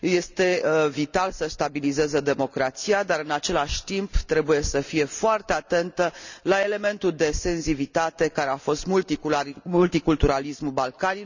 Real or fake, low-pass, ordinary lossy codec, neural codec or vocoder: real; 7.2 kHz; none; none